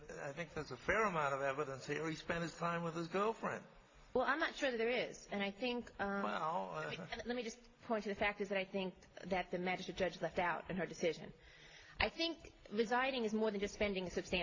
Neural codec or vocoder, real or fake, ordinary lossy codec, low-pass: none; real; AAC, 32 kbps; 7.2 kHz